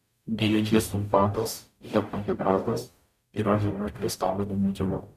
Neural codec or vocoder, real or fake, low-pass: codec, 44.1 kHz, 0.9 kbps, DAC; fake; 14.4 kHz